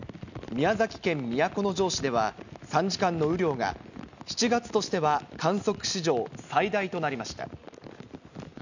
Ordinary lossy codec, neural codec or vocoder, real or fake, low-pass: none; none; real; 7.2 kHz